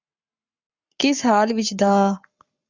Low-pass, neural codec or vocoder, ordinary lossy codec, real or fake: 7.2 kHz; codec, 44.1 kHz, 7.8 kbps, Pupu-Codec; Opus, 64 kbps; fake